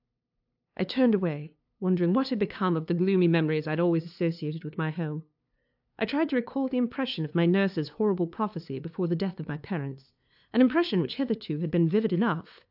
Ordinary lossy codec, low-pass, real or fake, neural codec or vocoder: AAC, 48 kbps; 5.4 kHz; fake; codec, 16 kHz, 2 kbps, FunCodec, trained on LibriTTS, 25 frames a second